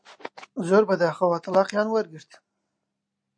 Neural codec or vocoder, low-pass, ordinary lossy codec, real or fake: none; 9.9 kHz; MP3, 48 kbps; real